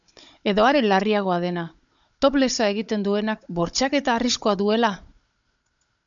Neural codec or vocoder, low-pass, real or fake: codec, 16 kHz, 16 kbps, FunCodec, trained on Chinese and English, 50 frames a second; 7.2 kHz; fake